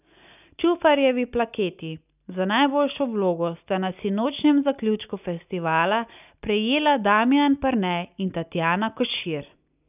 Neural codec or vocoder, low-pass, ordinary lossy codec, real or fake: none; 3.6 kHz; none; real